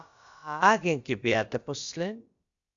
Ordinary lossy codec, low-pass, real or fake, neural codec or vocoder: Opus, 64 kbps; 7.2 kHz; fake; codec, 16 kHz, about 1 kbps, DyCAST, with the encoder's durations